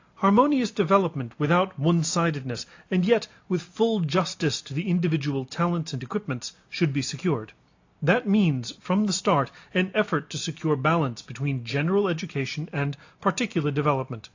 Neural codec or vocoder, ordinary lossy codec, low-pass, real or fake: none; AAC, 48 kbps; 7.2 kHz; real